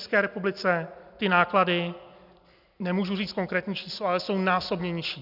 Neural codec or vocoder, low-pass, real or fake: none; 5.4 kHz; real